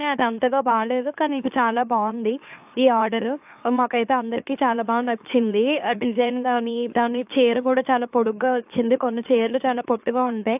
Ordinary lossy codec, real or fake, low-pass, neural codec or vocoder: AAC, 32 kbps; fake; 3.6 kHz; autoencoder, 44.1 kHz, a latent of 192 numbers a frame, MeloTTS